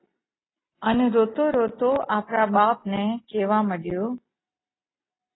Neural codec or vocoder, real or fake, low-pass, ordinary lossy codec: none; real; 7.2 kHz; AAC, 16 kbps